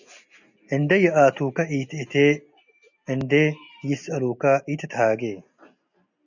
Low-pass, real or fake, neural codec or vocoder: 7.2 kHz; real; none